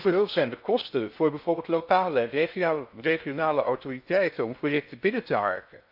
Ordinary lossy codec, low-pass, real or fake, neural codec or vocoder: none; 5.4 kHz; fake; codec, 16 kHz in and 24 kHz out, 0.6 kbps, FocalCodec, streaming, 4096 codes